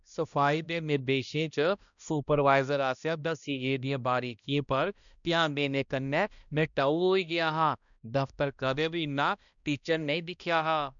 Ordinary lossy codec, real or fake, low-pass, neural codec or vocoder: none; fake; 7.2 kHz; codec, 16 kHz, 1 kbps, X-Codec, HuBERT features, trained on balanced general audio